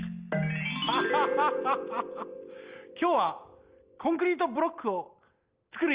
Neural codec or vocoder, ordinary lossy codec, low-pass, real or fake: none; Opus, 24 kbps; 3.6 kHz; real